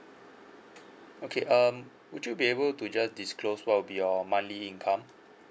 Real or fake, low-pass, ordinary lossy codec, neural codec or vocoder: real; none; none; none